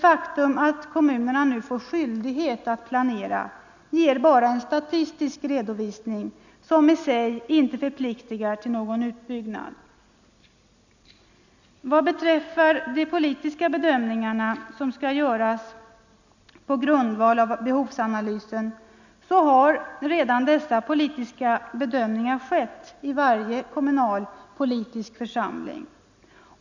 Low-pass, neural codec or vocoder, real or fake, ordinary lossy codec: 7.2 kHz; none; real; none